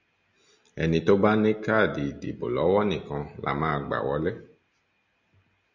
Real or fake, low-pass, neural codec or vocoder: real; 7.2 kHz; none